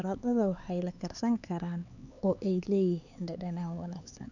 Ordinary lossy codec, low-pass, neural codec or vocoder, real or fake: none; 7.2 kHz; codec, 16 kHz, 4 kbps, X-Codec, HuBERT features, trained on LibriSpeech; fake